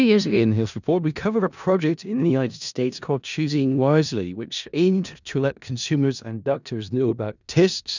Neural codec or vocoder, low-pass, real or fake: codec, 16 kHz in and 24 kHz out, 0.4 kbps, LongCat-Audio-Codec, four codebook decoder; 7.2 kHz; fake